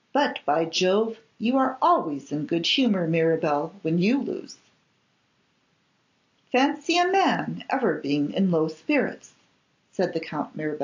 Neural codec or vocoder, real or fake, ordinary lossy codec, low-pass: none; real; AAC, 48 kbps; 7.2 kHz